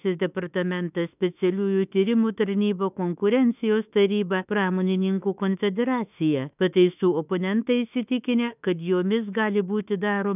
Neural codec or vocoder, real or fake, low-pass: autoencoder, 48 kHz, 32 numbers a frame, DAC-VAE, trained on Japanese speech; fake; 3.6 kHz